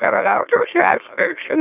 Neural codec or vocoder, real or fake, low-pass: autoencoder, 44.1 kHz, a latent of 192 numbers a frame, MeloTTS; fake; 3.6 kHz